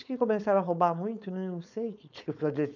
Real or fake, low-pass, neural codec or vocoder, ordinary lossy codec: fake; 7.2 kHz; codec, 16 kHz, 4.8 kbps, FACodec; none